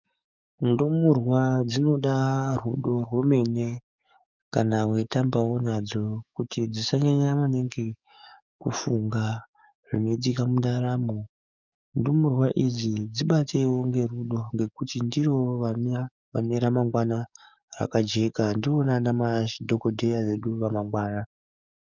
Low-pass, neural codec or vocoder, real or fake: 7.2 kHz; codec, 44.1 kHz, 7.8 kbps, DAC; fake